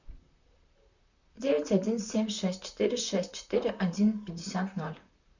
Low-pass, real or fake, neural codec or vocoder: 7.2 kHz; fake; vocoder, 44.1 kHz, 128 mel bands, Pupu-Vocoder